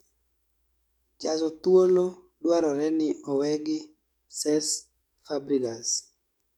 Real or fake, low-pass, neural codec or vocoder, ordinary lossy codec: fake; 19.8 kHz; codec, 44.1 kHz, 7.8 kbps, DAC; none